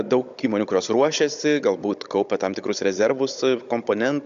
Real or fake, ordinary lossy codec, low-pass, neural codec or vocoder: fake; MP3, 64 kbps; 7.2 kHz; codec, 16 kHz, 16 kbps, FunCodec, trained on Chinese and English, 50 frames a second